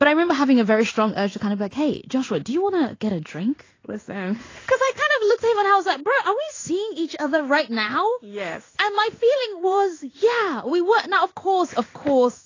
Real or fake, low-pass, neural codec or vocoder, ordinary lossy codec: fake; 7.2 kHz; codec, 16 kHz in and 24 kHz out, 1 kbps, XY-Tokenizer; AAC, 32 kbps